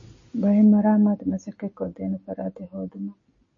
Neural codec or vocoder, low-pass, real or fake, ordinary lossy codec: none; 7.2 kHz; real; MP3, 32 kbps